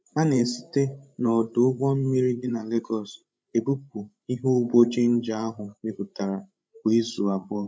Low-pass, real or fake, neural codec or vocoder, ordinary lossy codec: none; fake; codec, 16 kHz, 16 kbps, FreqCodec, larger model; none